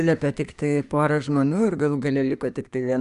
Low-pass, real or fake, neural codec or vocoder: 10.8 kHz; fake; codec, 24 kHz, 1 kbps, SNAC